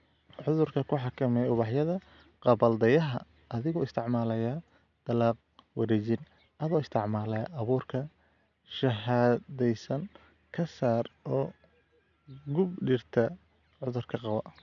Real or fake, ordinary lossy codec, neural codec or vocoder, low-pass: real; none; none; 7.2 kHz